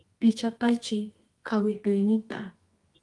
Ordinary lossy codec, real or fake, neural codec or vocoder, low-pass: Opus, 24 kbps; fake; codec, 24 kHz, 0.9 kbps, WavTokenizer, medium music audio release; 10.8 kHz